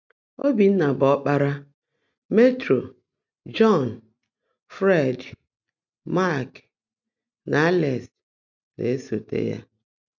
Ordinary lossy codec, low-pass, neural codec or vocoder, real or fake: none; 7.2 kHz; none; real